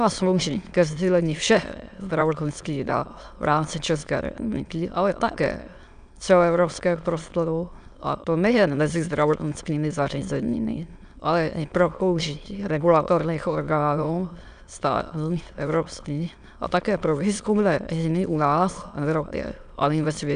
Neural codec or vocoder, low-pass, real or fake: autoencoder, 22.05 kHz, a latent of 192 numbers a frame, VITS, trained on many speakers; 9.9 kHz; fake